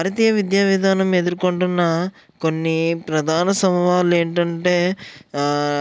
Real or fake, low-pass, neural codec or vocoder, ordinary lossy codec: real; none; none; none